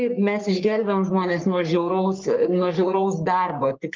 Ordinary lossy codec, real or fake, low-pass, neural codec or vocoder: Opus, 32 kbps; fake; 7.2 kHz; codec, 44.1 kHz, 3.4 kbps, Pupu-Codec